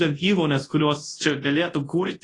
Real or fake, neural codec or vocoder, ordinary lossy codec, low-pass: fake; codec, 24 kHz, 0.9 kbps, WavTokenizer, large speech release; AAC, 32 kbps; 10.8 kHz